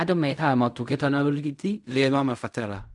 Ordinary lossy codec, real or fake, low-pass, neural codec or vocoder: none; fake; 10.8 kHz; codec, 16 kHz in and 24 kHz out, 0.4 kbps, LongCat-Audio-Codec, fine tuned four codebook decoder